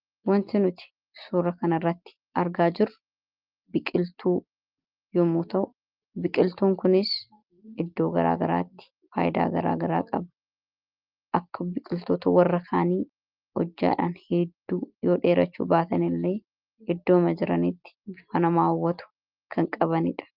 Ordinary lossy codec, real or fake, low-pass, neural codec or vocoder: Opus, 32 kbps; real; 5.4 kHz; none